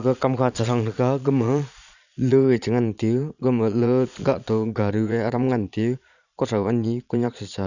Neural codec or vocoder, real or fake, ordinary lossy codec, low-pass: vocoder, 22.05 kHz, 80 mel bands, Vocos; fake; none; 7.2 kHz